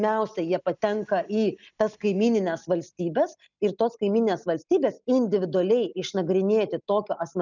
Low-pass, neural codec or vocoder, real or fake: 7.2 kHz; none; real